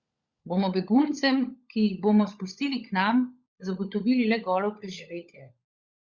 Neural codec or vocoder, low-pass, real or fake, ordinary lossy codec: codec, 16 kHz, 16 kbps, FunCodec, trained on LibriTTS, 50 frames a second; 7.2 kHz; fake; Opus, 64 kbps